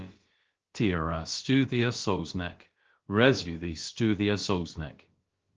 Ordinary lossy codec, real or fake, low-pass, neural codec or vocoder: Opus, 16 kbps; fake; 7.2 kHz; codec, 16 kHz, about 1 kbps, DyCAST, with the encoder's durations